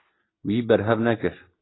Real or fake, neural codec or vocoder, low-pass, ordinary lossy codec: real; none; 7.2 kHz; AAC, 16 kbps